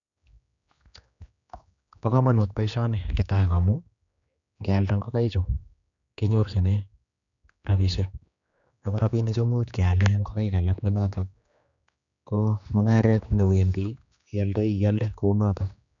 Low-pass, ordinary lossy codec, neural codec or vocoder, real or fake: 7.2 kHz; none; codec, 16 kHz, 2 kbps, X-Codec, HuBERT features, trained on general audio; fake